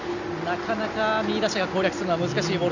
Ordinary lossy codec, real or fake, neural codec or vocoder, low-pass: none; real; none; 7.2 kHz